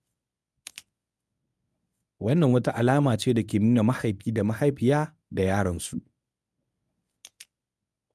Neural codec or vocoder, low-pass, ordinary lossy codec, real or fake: codec, 24 kHz, 0.9 kbps, WavTokenizer, medium speech release version 1; none; none; fake